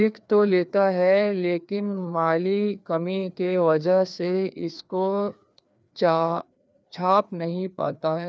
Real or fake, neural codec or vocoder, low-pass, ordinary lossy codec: fake; codec, 16 kHz, 2 kbps, FreqCodec, larger model; none; none